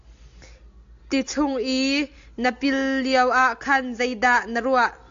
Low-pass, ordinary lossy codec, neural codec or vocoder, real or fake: 7.2 kHz; AAC, 64 kbps; none; real